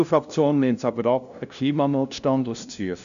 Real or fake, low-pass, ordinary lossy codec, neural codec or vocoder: fake; 7.2 kHz; MP3, 96 kbps; codec, 16 kHz, 0.5 kbps, FunCodec, trained on LibriTTS, 25 frames a second